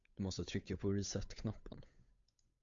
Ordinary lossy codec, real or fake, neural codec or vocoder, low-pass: AAC, 64 kbps; fake; codec, 16 kHz, 8 kbps, FunCodec, trained on Chinese and English, 25 frames a second; 7.2 kHz